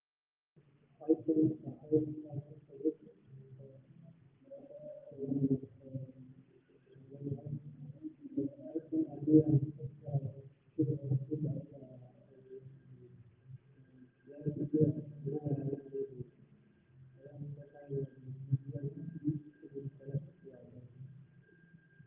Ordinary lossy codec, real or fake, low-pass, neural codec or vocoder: Opus, 32 kbps; real; 3.6 kHz; none